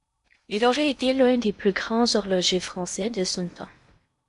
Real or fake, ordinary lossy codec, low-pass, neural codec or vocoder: fake; MP3, 96 kbps; 10.8 kHz; codec, 16 kHz in and 24 kHz out, 0.6 kbps, FocalCodec, streaming, 2048 codes